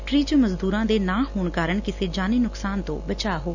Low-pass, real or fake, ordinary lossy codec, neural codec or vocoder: 7.2 kHz; real; none; none